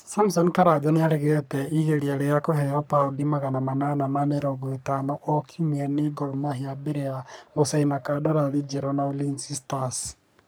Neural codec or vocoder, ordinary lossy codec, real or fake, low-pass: codec, 44.1 kHz, 3.4 kbps, Pupu-Codec; none; fake; none